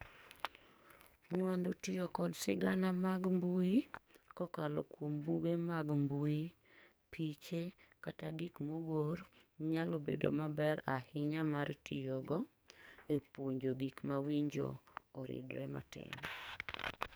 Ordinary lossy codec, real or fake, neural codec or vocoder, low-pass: none; fake; codec, 44.1 kHz, 2.6 kbps, SNAC; none